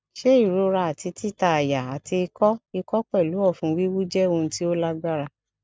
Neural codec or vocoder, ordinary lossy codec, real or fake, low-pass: none; none; real; none